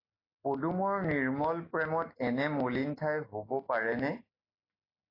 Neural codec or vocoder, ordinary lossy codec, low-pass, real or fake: none; AAC, 24 kbps; 5.4 kHz; real